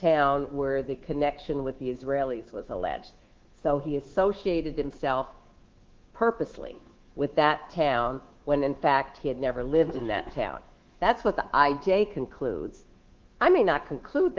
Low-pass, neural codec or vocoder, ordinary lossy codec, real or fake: 7.2 kHz; codec, 24 kHz, 3.1 kbps, DualCodec; Opus, 16 kbps; fake